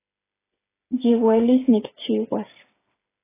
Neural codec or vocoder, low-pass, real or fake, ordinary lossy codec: codec, 16 kHz, 8 kbps, FreqCodec, smaller model; 3.6 kHz; fake; AAC, 16 kbps